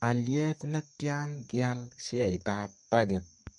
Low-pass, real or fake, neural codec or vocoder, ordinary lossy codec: 10.8 kHz; fake; codec, 32 kHz, 1.9 kbps, SNAC; MP3, 48 kbps